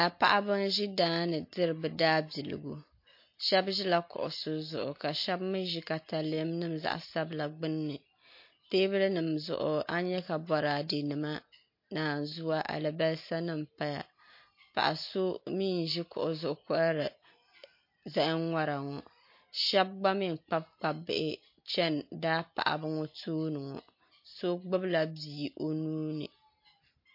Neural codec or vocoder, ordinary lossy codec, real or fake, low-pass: none; MP3, 32 kbps; real; 5.4 kHz